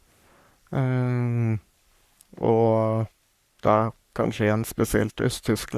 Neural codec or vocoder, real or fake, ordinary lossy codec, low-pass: codec, 44.1 kHz, 3.4 kbps, Pupu-Codec; fake; Opus, 64 kbps; 14.4 kHz